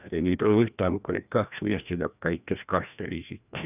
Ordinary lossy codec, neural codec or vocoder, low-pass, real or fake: none; codec, 16 kHz, 1 kbps, FreqCodec, larger model; 3.6 kHz; fake